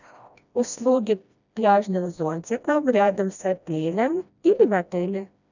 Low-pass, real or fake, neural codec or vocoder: 7.2 kHz; fake; codec, 16 kHz, 1 kbps, FreqCodec, smaller model